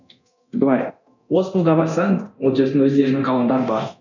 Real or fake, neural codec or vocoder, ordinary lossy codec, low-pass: fake; codec, 24 kHz, 0.9 kbps, DualCodec; none; 7.2 kHz